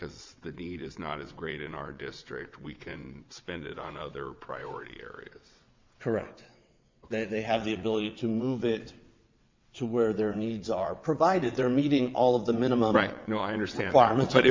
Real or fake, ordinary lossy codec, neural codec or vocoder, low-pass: fake; MP3, 64 kbps; vocoder, 22.05 kHz, 80 mel bands, WaveNeXt; 7.2 kHz